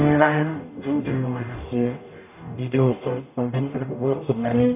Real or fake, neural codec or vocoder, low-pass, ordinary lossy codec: fake; codec, 44.1 kHz, 0.9 kbps, DAC; 3.6 kHz; none